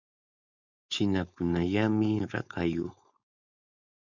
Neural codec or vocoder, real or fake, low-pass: codec, 16 kHz, 4.8 kbps, FACodec; fake; 7.2 kHz